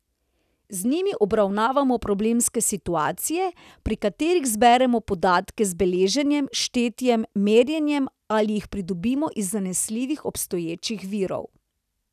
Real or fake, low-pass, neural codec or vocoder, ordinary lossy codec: real; 14.4 kHz; none; none